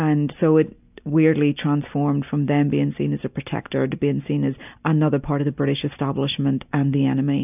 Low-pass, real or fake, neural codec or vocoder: 3.6 kHz; real; none